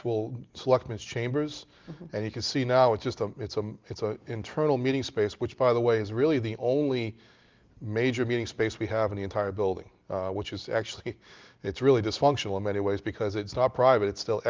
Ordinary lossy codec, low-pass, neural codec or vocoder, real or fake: Opus, 32 kbps; 7.2 kHz; none; real